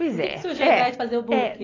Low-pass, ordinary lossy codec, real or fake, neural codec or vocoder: 7.2 kHz; AAC, 32 kbps; real; none